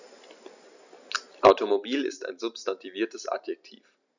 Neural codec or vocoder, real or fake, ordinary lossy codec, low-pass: none; real; none; 7.2 kHz